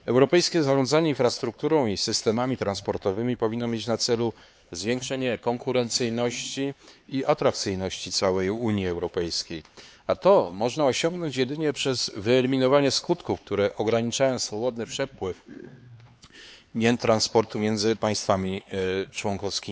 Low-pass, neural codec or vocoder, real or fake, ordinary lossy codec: none; codec, 16 kHz, 4 kbps, X-Codec, HuBERT features, trained on LibriSpeech; fake; none